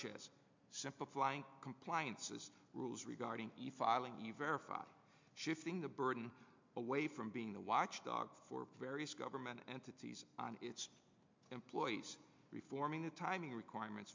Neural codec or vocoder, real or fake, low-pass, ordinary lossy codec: none; real; 7.2 kHz; MP3, 64 kbps